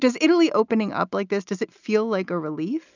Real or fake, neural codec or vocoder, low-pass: real; none; 7.2 kHz